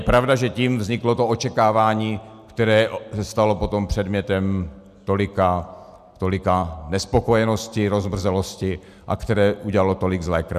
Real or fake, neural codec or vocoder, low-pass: real; none; 14.4 kHz